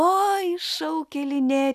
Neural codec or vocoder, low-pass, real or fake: none; 14.4 kHz; real